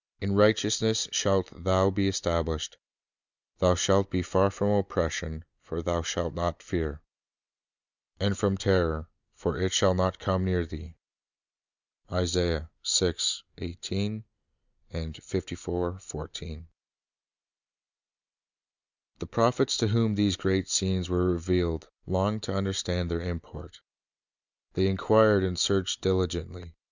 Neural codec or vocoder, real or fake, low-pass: none; real; 7.2 kHz